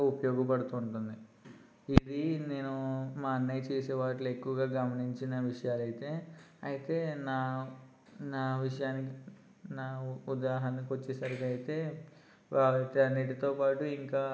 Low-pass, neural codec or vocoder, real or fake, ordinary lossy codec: none; none; real; none